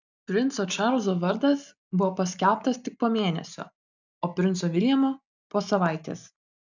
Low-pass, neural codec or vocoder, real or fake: 7.2 kHz; none; real